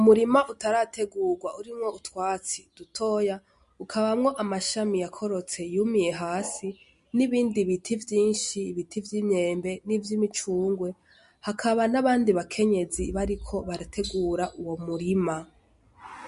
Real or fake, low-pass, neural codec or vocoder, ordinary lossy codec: real; 10.8 kHz; none; MP3, 48 kbps